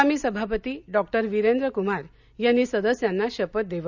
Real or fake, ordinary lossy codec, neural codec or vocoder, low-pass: real; none; none; 7.2 kHz